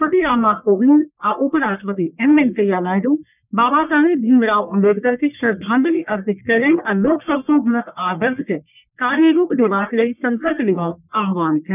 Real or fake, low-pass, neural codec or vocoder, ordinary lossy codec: fake; 3.6 kHz; codec, 44.1 kHz, 1.7 kbps, Pupu-Codec; none